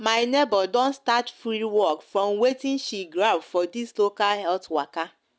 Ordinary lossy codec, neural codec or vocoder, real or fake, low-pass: none; none; real; none